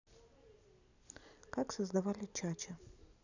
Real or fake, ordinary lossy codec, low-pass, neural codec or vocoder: real; none; 7.2 kHz; none